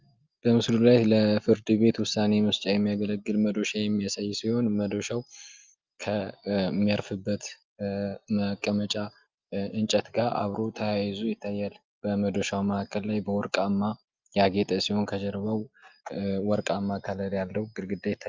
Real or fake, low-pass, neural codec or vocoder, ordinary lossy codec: real; 7.2 kHz; none; Opus, 24 kbps